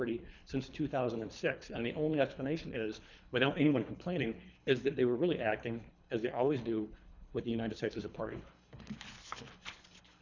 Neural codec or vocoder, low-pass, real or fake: codec, 24 kHz, 3 kbps, HILCodec; 7.2 kHz; fake